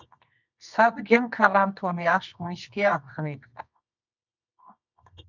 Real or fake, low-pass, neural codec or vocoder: fake; 7.2 kHz; codec, 24 kHz, 0.9 kbps, WavTokenizer, medium music audio release